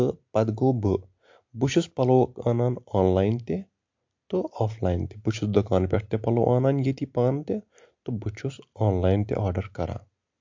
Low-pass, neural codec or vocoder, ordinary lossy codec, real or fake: 7.2 kHz; none; MP3, 48 kbps; real